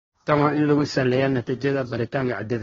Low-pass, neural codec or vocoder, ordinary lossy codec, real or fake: 7.2 kHz; codec, 16 kHz, 1.1 kbps, Voila-Tokenizer; AAC, 24 kbps; fake